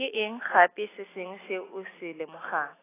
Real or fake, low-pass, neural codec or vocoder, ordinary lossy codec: real; 3.6 kHz; none; AAC, 16 kbps